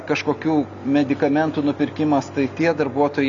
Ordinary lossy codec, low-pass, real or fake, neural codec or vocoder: MP3, 96 kbps; 7.2 kHz; real; none